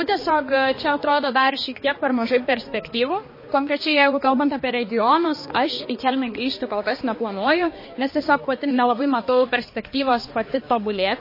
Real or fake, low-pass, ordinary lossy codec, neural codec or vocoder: fake; 5.4 kHz; MP3, 24 kbps; codec, 16 kHz, 2 kbps, X-Codec, HuBERT features, trained on balanced general audio